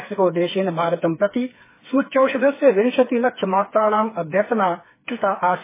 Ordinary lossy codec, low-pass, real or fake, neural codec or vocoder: MP3, 16 kbps; 3.6 kHz; fake; codec, 16 kHz, 4 kbps, FreqCodec, smaller model